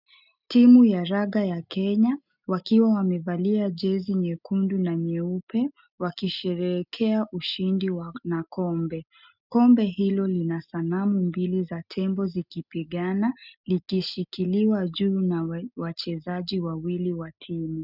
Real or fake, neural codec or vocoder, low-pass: real; none; 5.4 kHz